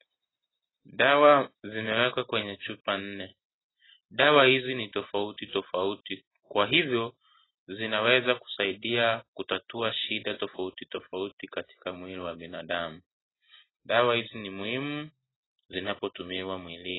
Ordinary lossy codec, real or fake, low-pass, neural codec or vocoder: AAC, 16 kbps; real; 7.2 kHz; none